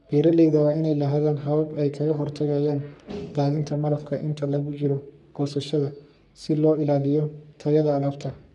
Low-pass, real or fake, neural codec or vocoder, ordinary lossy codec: 10.8 kHz; fake; codec, 44.1 kHz, 3.4 kbps, Pupu-Codec; none